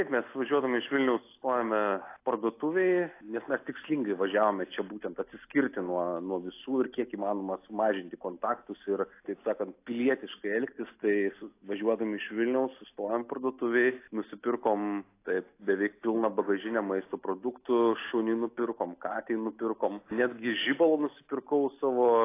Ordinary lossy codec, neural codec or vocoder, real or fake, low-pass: AAC, 24 kbps; none; real; 3.6 kHz